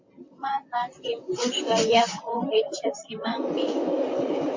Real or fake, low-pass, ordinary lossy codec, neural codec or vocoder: fake; 7.2 kHz; MP3, 48 kbps; vocoder, 44.1 kHz, 128 mel bands, Pupu-Vocoder